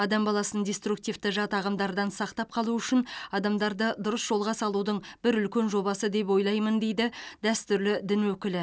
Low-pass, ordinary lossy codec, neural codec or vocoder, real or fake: none; none; none; real